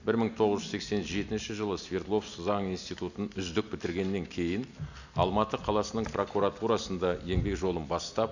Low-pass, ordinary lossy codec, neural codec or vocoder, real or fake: 7.2 kHz; none; none; real